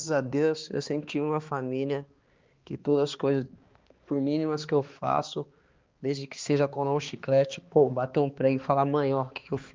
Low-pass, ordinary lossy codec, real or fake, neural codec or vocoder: 7.2 kHz; Opus, 32 kbps; fake; codec, 16 kHz, 2 kbps, X-Codec, HuBERT features, trained on balanced general audio